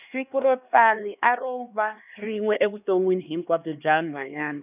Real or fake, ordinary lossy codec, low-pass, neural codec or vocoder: fake; none; 3.6 kHz; codec, 16 kHz, 2 kbps, X-Codec, HuBERT features, trained on LibriSpeech